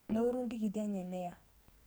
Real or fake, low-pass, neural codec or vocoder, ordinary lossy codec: fake; none; codec, 44.1 kHz, 2.6 kbps, SNAC; none